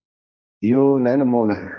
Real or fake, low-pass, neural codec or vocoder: fake; 7.2 kHz; codec, 16 kHz, 1.1 kbps, Voila-Tokenizer